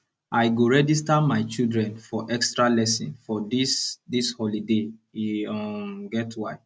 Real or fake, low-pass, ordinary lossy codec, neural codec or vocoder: real; none; none; none